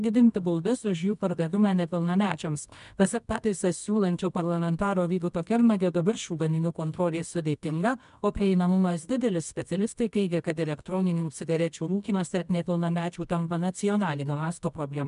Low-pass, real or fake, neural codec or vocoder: 10.8 kHz; fake; codec, 24 kHz, 0.9 kbps, WavTokenizer, medium music audio release